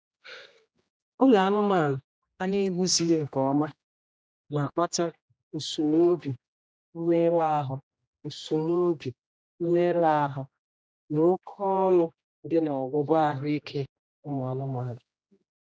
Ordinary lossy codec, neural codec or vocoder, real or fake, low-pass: none; codec, 16 kHz, 1 kbps, X-Codec, HuBERT features, trained on general audio; fake; none